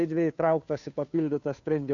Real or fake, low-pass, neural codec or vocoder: fake; 7.2 kHz; codec, 16 kHz, 2 kbps, FunCodec, trained on Chinese and English, 25 frames a second